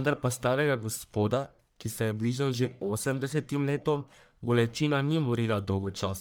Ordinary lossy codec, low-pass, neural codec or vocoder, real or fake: none; none; codec, 44.1 kHz, 1.7 kbps, Pupu-Codec; fake